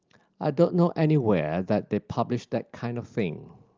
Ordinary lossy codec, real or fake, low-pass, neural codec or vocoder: Opus, 32 kbps; real; 7.2 kHz; none